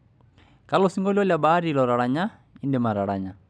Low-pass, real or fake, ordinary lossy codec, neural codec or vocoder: 9.9 kHz; real; none; none